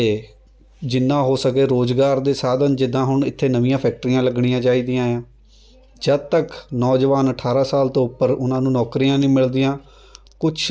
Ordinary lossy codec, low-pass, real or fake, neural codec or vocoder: none; none; real; none